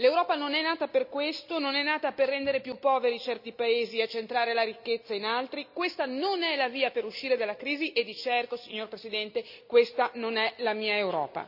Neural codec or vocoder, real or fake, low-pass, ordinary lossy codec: none; real; 5.4 kHz; none